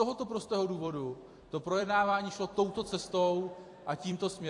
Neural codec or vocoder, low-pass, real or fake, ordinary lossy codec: vocoder, 44.1 kHz, 128 mel bands every 256 samples, BigVGAN v2; 10.8 kHz; fake; AAC, 48 kbps